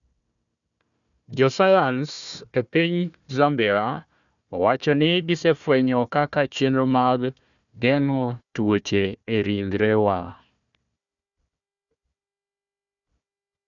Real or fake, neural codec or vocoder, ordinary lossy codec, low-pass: fake; codec, 16 kHz, 1 kbps, FunCodec, trained on Chinese and English, 50 frames a second; none; 7.2 kHz